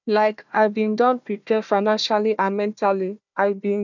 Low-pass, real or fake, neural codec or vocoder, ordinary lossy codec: 7.2 kHz; fake; codec, 16 kHz, 1 kbps, FunCodec, trained on Chinese and English, 50 frames a second; none